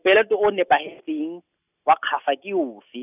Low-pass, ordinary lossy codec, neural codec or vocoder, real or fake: 3.6 kHz; none; none; real